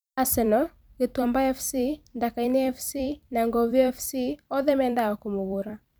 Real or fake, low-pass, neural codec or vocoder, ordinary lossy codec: fake; none; vocoder, 44.1 kHz, 128 mel bands every 256 samples, BigVGAN v2; none